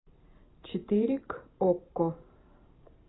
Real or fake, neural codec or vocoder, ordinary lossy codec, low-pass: fake; vocoder, 44.1 kHz, 128 mel bands every 512 samples, BigVGAN v2; AAC, 16 kbps; 7.2 kHz